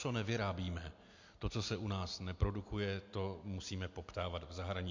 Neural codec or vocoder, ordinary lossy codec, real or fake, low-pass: none; MP3, 48 kbps; real; 7.2 kHz